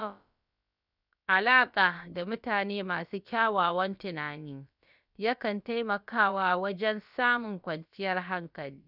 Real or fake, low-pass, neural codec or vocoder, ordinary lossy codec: fake; 5.4 kHz; codec, 16 kHz, about 1 kbps, DyCAST, with the encoder's durations; none